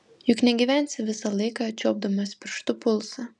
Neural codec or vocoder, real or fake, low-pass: none; real; 10.8 kHz